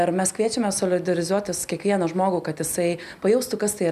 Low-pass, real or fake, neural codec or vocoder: 14.4 kHz; real; none